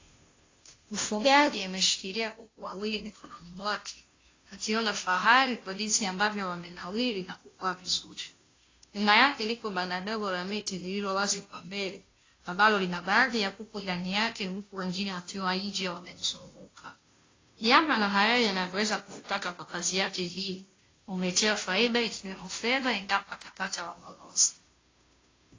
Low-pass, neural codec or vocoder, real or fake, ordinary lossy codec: 7.2 kHz; codec, 16 kHz, 0.5 kbps, FunCodec, trained on Chinese and English, 25 frames a second; fake; AAC, 32 kbps